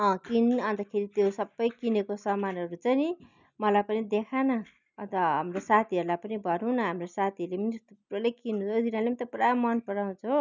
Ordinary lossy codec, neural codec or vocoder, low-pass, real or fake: none; none; 7.2 kHz; real